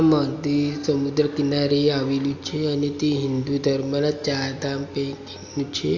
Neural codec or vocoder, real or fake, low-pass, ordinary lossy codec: none; real; 7.2 kHz; AAC, 48 kbps